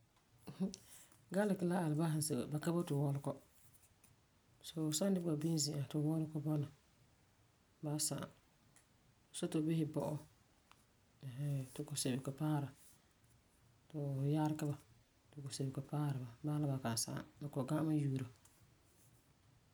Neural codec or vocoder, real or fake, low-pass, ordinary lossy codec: none; real; none; none